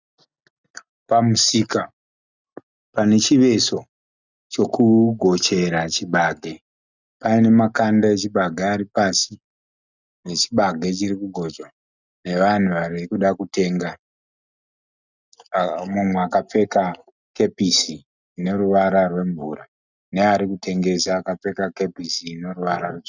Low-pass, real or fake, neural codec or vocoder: 7.2 kHz; real; none